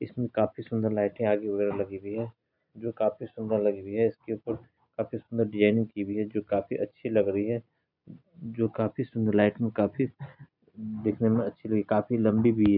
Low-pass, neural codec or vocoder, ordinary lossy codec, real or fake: 5.4 kHz; none; none; real